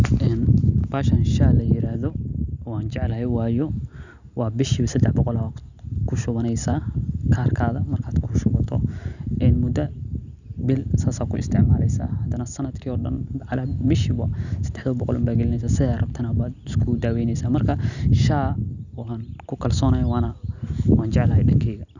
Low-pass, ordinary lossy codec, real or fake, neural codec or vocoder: 7.2 kHz; none; real; none